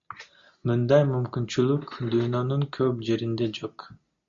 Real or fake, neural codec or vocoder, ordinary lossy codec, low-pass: real; none; MP3, 48 kbps; 7.2 kHz